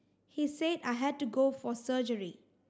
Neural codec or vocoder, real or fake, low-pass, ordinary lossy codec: none; real; none; none